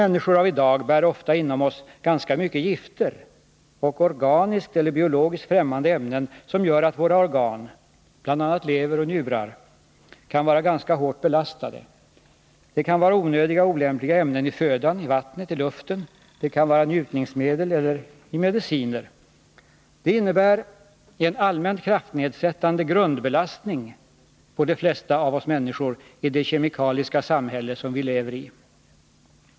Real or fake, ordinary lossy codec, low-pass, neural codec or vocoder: real; none; none; none